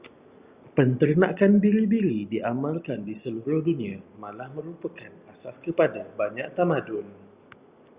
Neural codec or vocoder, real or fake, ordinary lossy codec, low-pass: none; real; Opus, 64 kbps; 3.6 kHz